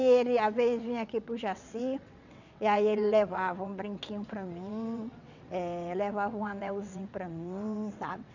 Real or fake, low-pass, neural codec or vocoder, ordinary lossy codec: fake; 7.2 kHz; vocoder, 22.05 kHz, 80 mel bands, WaveNeXt; none